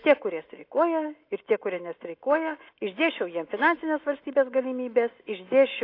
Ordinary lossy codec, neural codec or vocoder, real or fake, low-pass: AAC, 32 kbps; none; real; 5.4 kHz